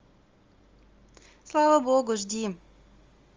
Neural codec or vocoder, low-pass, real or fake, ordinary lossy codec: none; 7.2 kHz; real; Opus, 32 kbps